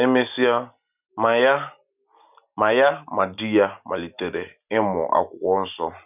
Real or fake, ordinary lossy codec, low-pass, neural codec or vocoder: real; none; 3.6 kHz; none